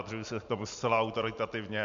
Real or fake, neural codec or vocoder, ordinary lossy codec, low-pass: real; none; MP3, 64 kbps; 7.2 kHz